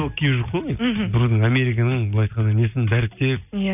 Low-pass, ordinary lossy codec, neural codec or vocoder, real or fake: 3.6 kHz; none; none; real